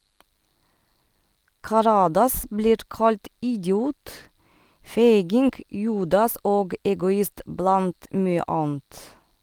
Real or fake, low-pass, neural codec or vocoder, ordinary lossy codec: real; 19.8 kHz; none; Opus, 24 kbps